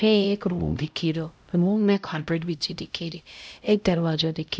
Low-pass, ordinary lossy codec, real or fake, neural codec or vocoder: none; none; fake; codec, 16 kHz, 0.5 kbps, X-Codec, HuBERT features, trained on LibriSpeech